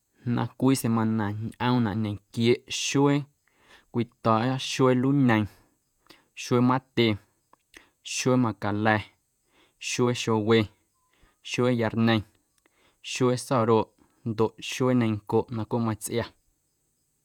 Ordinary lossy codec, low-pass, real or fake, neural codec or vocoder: none; 19.8 kHz; fake; vocoder, 48 kHz, 128 mel bands, Vocos